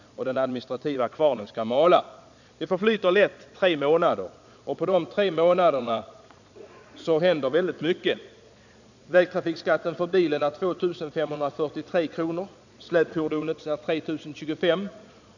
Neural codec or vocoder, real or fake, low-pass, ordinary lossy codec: vocoder, 22.05 kHz, 80 mel bands, Vocos; fake; 7.2 kHz; none